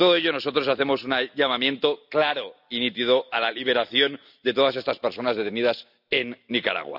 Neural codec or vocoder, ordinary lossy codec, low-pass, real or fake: none; none; 5.4 kHz; real